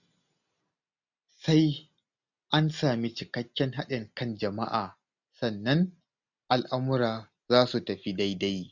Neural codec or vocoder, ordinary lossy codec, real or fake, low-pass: none; none; real; 7.2 kHz